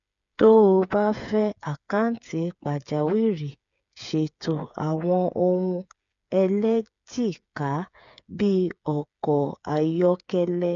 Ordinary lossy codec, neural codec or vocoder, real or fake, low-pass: none; codec, 16 kHz, 8 kbps, FreqCodec, smaller model; fake; 7.2 kHz